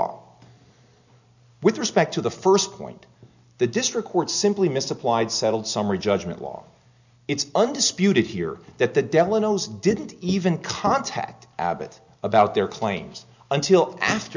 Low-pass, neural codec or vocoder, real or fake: 7.2 kHz; none; real